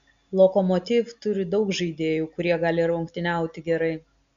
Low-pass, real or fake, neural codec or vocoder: 7.2 kHz; real; none